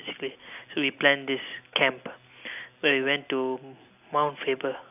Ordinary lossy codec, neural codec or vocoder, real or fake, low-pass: none; none; real; 3.6 kHz